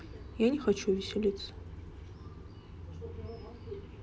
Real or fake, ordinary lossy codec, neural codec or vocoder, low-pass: real; none; none; none